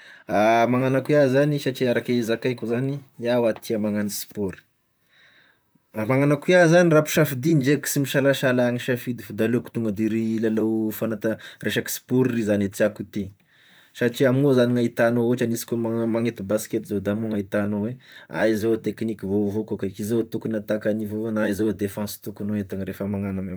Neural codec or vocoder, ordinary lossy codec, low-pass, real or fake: vocoder, 44.1 kHz, 128 mel bands, Pupu-Vocoder; none; none; fake